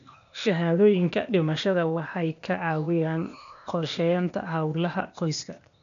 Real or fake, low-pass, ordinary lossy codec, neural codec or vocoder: fake; 7.2 kHz; none; codec, 16 kHz, 0.8 kbps, ZipCodec